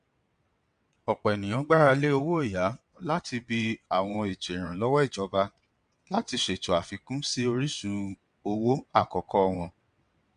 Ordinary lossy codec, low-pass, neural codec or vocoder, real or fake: MP3, 64 kbps; 9.9 kHz; vocoder, 22.05 kHz, 80 mel bands, WaveNeXt; fake